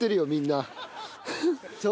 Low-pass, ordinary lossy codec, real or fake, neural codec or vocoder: none; none; real; none